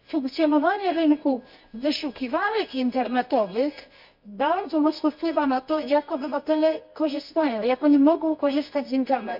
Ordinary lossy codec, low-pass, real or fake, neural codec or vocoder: MP3, 48 kbps; 5.4 kHz; fake; codec, 24 kHz, 0.9 kbps, WavTokenizer, medium music audio release